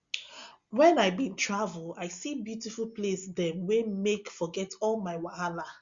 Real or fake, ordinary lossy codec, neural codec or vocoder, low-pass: real; Opus, 64 kbps; none; 7.2 kHz